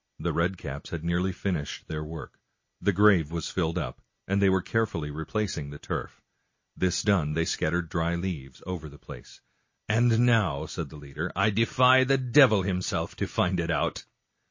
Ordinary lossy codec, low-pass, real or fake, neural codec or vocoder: MP3, 32 kbps; 7.2 kHz; real; none